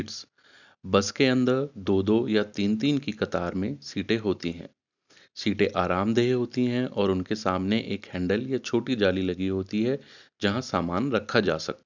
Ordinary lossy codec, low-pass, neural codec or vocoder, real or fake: none; 7.2 kHz; none; real